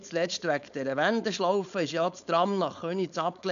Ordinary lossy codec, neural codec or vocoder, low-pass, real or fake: none; codec, 16 kHz, 4.8 kbps, FACodec; 7.2 kHz; fake